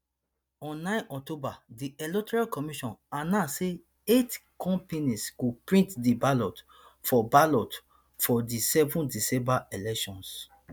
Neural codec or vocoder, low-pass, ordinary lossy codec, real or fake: none; none; none; real